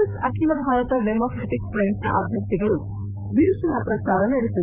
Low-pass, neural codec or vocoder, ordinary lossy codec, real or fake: 3.6 kHz; codec, 16 kHz, 4 kbps, FreqCodec, larger model; none; fake